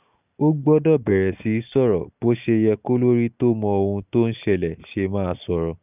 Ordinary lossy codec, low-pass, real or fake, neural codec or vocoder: none; 3.6 kHz; real; none